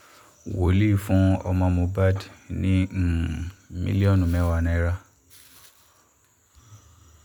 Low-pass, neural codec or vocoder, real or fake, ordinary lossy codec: none; none; real; none